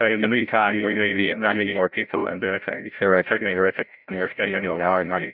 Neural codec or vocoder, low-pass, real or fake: codec, 16 kHz, 0.5 kbps, FreqCodec, larger model; 5.4 kHz; fake